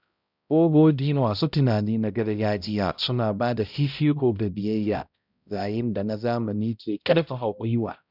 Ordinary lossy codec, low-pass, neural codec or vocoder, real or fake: none; 5.4 kHz; codec, 16 kHz, 0.5 kbps, X-Codec, HuBERT features, trained on balanced general audio; fake